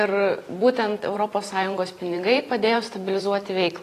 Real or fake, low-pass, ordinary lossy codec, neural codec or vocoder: fake; 14.4 kHz; AAC, 48 kbps; vocoder, 44.1 kHz, 128 mel bands every 512 samples, BigVGAN v2